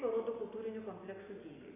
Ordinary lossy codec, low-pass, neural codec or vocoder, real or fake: AAC, 16 kbps; 3.6 kHz; none; real